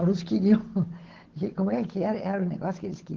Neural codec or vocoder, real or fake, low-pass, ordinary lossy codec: codec, 16 kHz, 8 kbps, FunCodec, trained on Chinese and English, 25 frames a second; fake; 7.2 kHz; Opus, 16 kbps